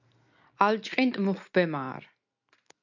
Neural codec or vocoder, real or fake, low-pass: none; real; 7.2 kHz